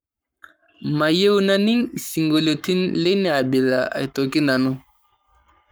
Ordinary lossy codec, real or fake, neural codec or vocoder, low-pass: none; fake; codec, 44.1 kHz, 7.8 kbps, Pupu-Codec; none